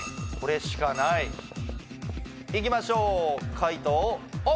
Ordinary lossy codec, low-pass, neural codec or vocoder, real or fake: none; none; none; real